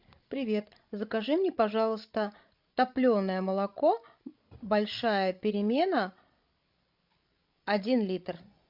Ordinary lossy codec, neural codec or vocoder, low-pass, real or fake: MP3, 48 kbps; codec, 16 kHz, 16 kbps, FreqCodec, larger model; 5.4 kHz; fake